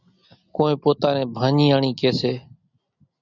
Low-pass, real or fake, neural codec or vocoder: 7.2 kHz; real; none